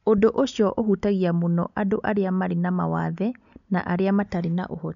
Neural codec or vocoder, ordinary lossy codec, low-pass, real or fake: none; none; 7.2 kHz; real